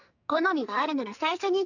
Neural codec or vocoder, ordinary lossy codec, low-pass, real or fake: codec, 44.1 kHz, 2.6 kbps, SNAC; none; 7.2 kHz; fake